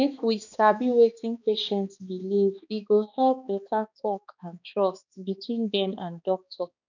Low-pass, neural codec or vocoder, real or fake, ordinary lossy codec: 7.2 kHz; codec, 16 kHz, 2 kbps, X-Codec, HuBERT features, trained on balanced general audio; fake; none